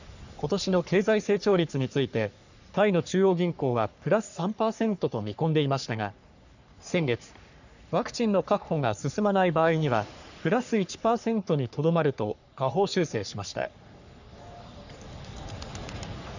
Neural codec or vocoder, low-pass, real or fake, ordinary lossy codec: codec, 44.1 kHz, 3.4 kbps, Pupu-Codec; 7.2 kHz; fake; none